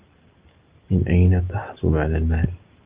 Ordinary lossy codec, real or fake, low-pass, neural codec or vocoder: Opus, 32 kbps; real; 3.6 kHz; none